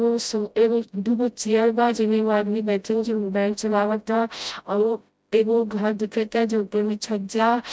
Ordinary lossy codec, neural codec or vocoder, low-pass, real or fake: none; codec, 16 kHz, 0.5 kbps, FreqCodec, smaller model; none; fake